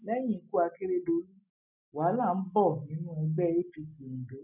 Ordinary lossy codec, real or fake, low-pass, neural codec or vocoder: none; real; 3.6 kHz; none